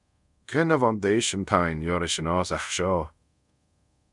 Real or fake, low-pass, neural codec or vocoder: fake; 10.8 kHz; codec, 24 kHz, 0.5 kbps, DualCodec